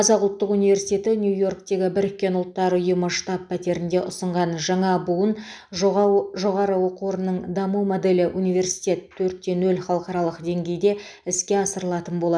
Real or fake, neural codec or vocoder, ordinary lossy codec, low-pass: real; none; none; 9.9 kHz